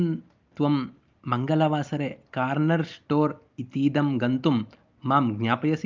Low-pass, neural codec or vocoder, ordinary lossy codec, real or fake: 7.2 kHz; none; Opus, 24 kbps; real